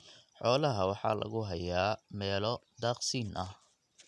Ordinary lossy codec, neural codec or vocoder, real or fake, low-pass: none; none; real; none